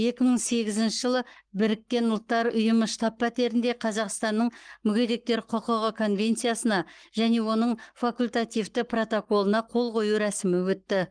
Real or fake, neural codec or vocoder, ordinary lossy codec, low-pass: fake; codec, 44.1 kHz, 7.8 kbps, Pupu-Codec; Opus, 24 kbps; 9.9 kHz